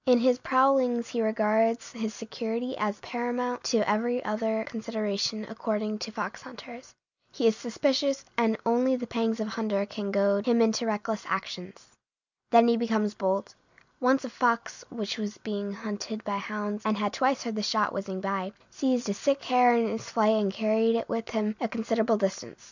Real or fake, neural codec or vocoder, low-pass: real; none; 7.2 kHz